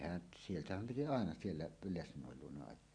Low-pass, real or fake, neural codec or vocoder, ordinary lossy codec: 9.9 kHz; real; none; none